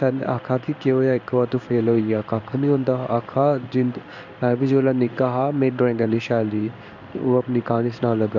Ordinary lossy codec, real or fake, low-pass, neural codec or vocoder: none; fake; 7.2 kHz; codec, 16 kHz in and 24 kHz out, 1 kbps, XY-Tokenizer